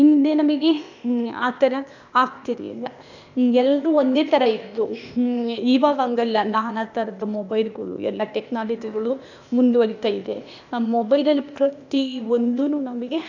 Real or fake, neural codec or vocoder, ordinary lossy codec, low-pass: fake; codec, 16 kHz, 0.8 kbps, ZipCodec; none; 7.2 kHz